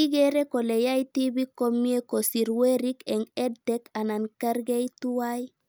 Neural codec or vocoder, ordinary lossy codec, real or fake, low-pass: none; none; real; none